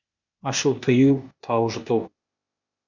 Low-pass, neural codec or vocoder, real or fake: 7.2 kHz; codec, 16 kHz, 0.8 kbps, ZipCodec; fake